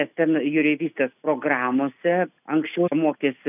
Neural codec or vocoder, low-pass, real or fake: none; 3.6 kHz; real